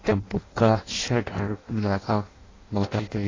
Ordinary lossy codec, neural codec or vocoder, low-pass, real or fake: AAC, 32 kbps; codec, 16 kHz in and 24 kHz out, 0.6 kbps, FireRedTTS-2 codec; 7.2 kHz; fake